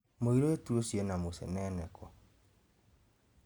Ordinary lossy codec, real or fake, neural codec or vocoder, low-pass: none; real; none; none